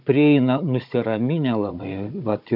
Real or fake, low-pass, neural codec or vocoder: fake; 5.4 kHz; codec, 16 kHz, 16 kbps, FunCodec, trained on Chinese and English, 50 frames a second